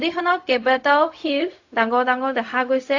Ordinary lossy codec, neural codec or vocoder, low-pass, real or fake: AAC, 48 kbps; codec, 16 kHz, 0.4 kbps, LongCat-Audio-Codec; 7.2 kHz; fake